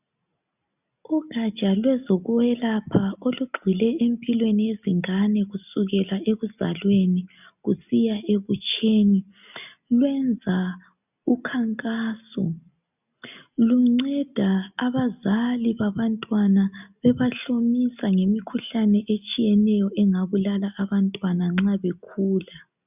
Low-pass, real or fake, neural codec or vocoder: 3.6 kHz; real; none